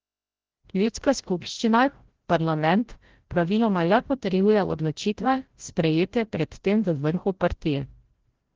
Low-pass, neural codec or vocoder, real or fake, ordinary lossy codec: 7.2 kHz; codec, 16 kHz, 0.5 kbps, FreqCodec, larger model; fake; Opus, 16 kbps